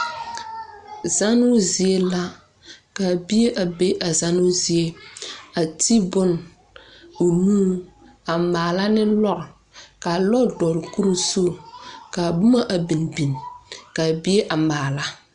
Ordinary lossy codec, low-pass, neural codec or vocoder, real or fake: Opus, 64 kbps; 9.9 kHz; none; real